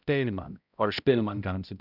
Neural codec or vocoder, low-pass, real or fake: codec, 16 kHz, 0.5 kbps, X-Codec, HuBERT features, trained on balanced general audio; 5.4 kHz; fake